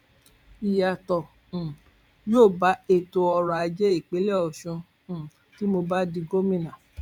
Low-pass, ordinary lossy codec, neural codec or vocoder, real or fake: 19.8 kHz; none; vocoder, 44.1 kHz, 128 mel bands every 512 samples, BigVGAN v2; fake